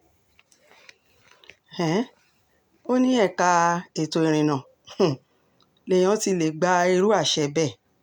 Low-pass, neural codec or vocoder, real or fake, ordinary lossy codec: 19.8 kHz; none; real; none